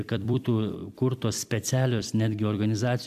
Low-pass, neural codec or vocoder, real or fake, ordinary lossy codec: 14.4 kHz; vocoder, 44.1 kHz, 128 mel bands every 256 samples, BigVGAN v2; fake; AAC, 96 kbps